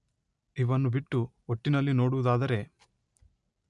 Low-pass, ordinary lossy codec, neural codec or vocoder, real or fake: 10.8 kHz; MP3, 96 kbps; none; real